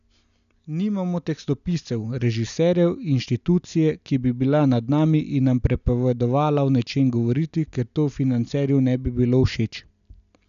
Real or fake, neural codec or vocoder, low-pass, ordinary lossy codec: real; none; 7.2 kHz; none